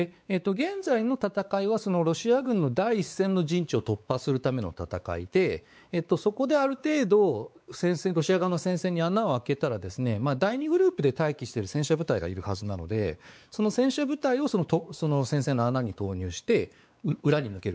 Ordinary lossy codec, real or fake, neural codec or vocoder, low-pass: none; fake; codec, 16 kHz, 4 kbps, X-Codec, WavLM features, trained on Multilingual LibriSpeech; none